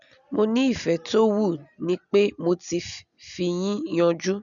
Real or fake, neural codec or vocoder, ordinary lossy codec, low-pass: real; none; none; 7.2 kHz